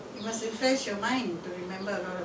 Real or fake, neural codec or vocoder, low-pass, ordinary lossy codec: real; none; none; none